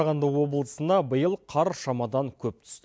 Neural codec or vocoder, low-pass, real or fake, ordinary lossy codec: none; none; real; none